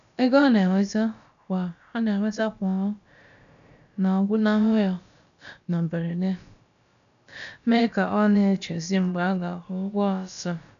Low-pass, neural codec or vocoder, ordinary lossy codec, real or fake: 7.2 kHz; codec, 16 kHz, about 1 kbps, DyCAST, with the encoder's durations; none; fake